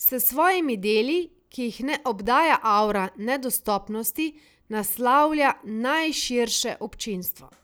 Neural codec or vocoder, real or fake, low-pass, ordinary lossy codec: none; real; none; none